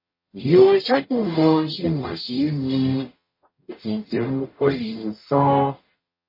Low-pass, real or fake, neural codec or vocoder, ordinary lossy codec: 5.4 kHz; fake; codec, 44.1 kHz, 0.9 kbps, DAC; MP3, 24 kbps